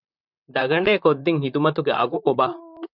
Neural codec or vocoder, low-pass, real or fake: vocoder, 44.1 kHz, 128 mel bands, Pupu-Vocoder; 5.4 kHz; fake